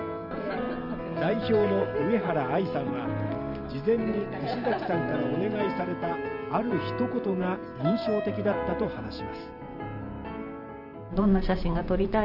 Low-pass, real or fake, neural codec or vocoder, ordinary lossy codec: 5.4 kHz; fake; vocoder, 44.1 kHz, 128 mel bands every 512 samples, BigVGAN v2; none